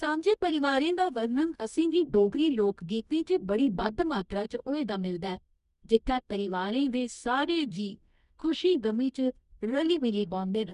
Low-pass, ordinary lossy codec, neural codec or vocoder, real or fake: 10.8 kHz; none; codec, 24 kHz, 0.9 kbps, WavTokenizer, medium music audio release; fake